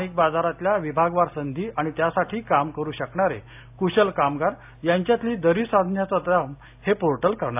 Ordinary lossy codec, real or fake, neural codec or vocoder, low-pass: AAC, 32 kbps; real; none; 3.6 kHz